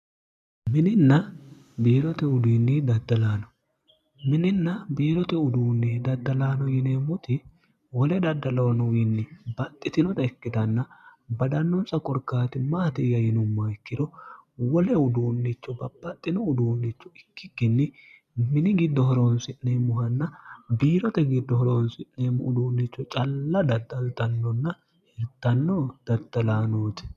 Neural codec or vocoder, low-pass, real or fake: none; 14.4 kHz; real